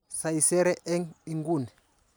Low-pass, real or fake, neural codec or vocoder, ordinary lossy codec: none; real; none; none